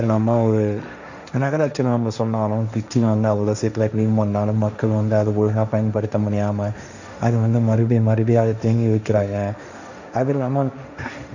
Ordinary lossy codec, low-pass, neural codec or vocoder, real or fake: none; 7.2 kHz; codec, 16 kHz, 1.1 kbps, Voila-Tokenizer; fake